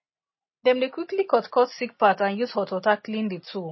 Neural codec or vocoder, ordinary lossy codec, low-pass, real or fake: none; MP3, 24 kbps; 7.2 kHz; real